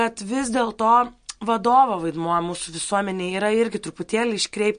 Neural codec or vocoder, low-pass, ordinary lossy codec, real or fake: none; 9.9 kHz; MP3, 48 kbps; real